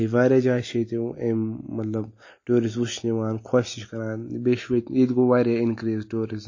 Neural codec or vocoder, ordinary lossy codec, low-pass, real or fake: none; MP3, 32 kbps; 7.2 kHz; real